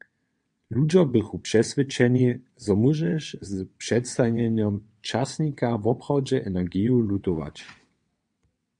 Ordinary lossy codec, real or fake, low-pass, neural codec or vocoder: MP3, 64 kbps; fake; 9.9 kHz; vocoder, 22.05 kHz, 80 mel bands, WaveNeXt